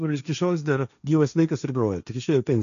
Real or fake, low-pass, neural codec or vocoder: fake; 7.2 kHz; codec, 16 kHz, 1.1 kbps, Voila-Tokenizer